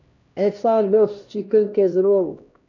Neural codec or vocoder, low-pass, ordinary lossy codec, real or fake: codec, 16 kHz, 1 kbps, X-Codec, HuBERT features, trained on LibriSpeech; 7.2 kHz; none; fake